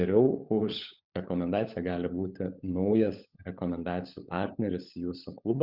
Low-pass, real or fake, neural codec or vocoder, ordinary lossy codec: 5.4 kHz; fake; codec, 16 kHz, 16 kbps, FunCodec, trained on LibriTTS, 50 frames a second; Opus, 64 kbps